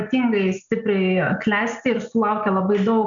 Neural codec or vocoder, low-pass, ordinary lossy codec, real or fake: none; 7.2 kHz; MP3, 64 kbps; real